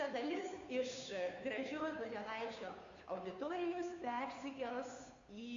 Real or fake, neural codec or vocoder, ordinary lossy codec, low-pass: fake; codec, 16 kHz, 2 kbps, FunCodec, trained on Chinese and English, 25 frames a second; AAC, 48 kbps; 7.2 kHz